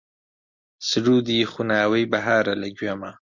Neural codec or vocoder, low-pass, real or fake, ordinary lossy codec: none; 7.2 kHz; real; MP3, 48 kbps